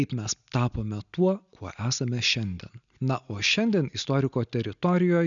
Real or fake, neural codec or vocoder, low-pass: real; none; 7.2 kHz